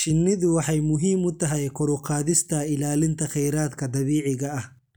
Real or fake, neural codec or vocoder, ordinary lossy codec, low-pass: real; none; none; none